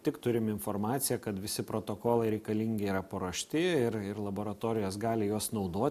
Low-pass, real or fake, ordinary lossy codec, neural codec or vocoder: 14.4 kHz; real; MP3, 96 kbps; none